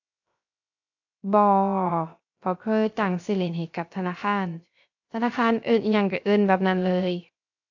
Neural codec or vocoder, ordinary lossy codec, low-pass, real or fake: codec, 16 kHz, 0.3 kbps, FocalCodec; AAC, 48 kbps; 7.2 kHz; fake